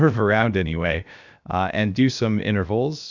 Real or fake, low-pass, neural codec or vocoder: fake; 7.2 kHz; codec, 16 kHz, 0.7 kbps, FocalCodec